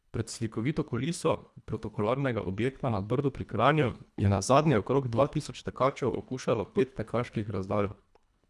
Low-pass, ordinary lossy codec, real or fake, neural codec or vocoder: none; none; fake; codec, 24 kHz, 1.5 kbps, HILCodec